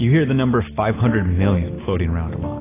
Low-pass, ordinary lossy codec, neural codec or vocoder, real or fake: 3.6 kHz; AAC, 16 kbps; none; real